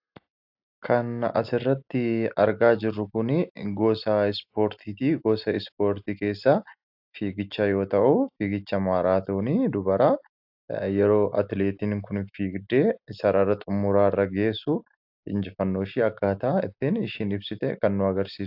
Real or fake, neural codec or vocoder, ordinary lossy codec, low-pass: real; none; AAC, 48 kbps; 5.4 kHz